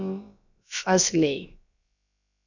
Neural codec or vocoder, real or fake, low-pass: codec, 16 kHz, about 1 kbps, DyCAST, with the encoder's durations; fake; 7.2 kHz